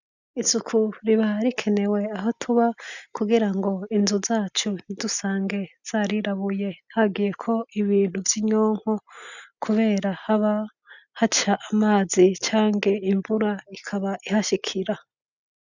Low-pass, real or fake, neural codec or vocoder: 7.2 kHz; real; none